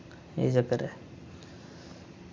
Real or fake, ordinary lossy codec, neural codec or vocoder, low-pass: real; none; none; none